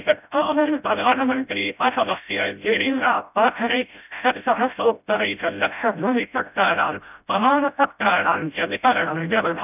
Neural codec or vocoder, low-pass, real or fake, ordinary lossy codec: codec, 16 kHz, 0.5 kbps, FreqCodec, smaller model; 3.6 kHz; fake; none